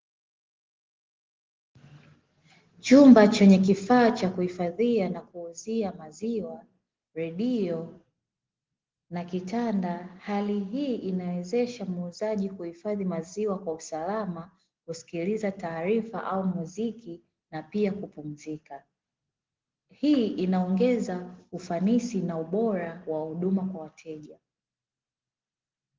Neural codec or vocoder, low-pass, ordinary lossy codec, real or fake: none; 7.2 kHz; Opus, 16 kbps; real